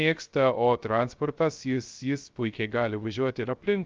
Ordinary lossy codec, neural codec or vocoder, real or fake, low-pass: Opus, 24 kbps; codec, 16 kHz, 0.3 kbps, FocalCodec; fake; 7.2 kHz